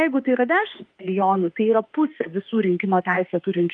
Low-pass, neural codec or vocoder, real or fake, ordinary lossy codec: 9.9 kHz; autoencoder, 48 kHz, 32 numbers a frame, DAC-VAE, trained on Japanese speech; fake; Opus, 32 kbps